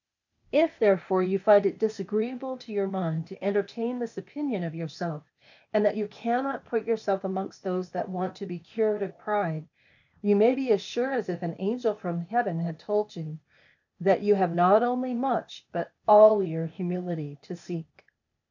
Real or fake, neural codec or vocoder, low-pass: fake; codec, 16 kHz, 0.8 kbps, ZipCodec; 7.2 kHz